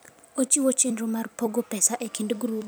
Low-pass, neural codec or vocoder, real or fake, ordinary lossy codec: none; vocoder, 44.1 kHz, 128 mel bands every 256 samples, BigVGAN v2; fake; none